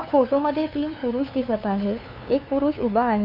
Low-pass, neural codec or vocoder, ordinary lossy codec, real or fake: 5.4 kHz; codec, 16 kHz, 2 kbps, FunCodec, trained on LibriTTS, 25 frames a second; none; fake